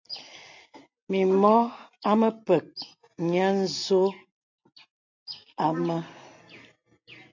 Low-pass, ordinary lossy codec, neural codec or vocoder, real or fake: 7.2 kHz; MP3, 48 kbps; none; real